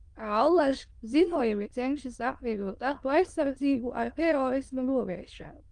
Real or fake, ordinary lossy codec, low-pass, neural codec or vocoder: fake; Opus, 24 kbps; 9.9 kHz; autoencoder, 22.05 kHz, a latent of 192 numbers a frame, VITS, trained on many speakers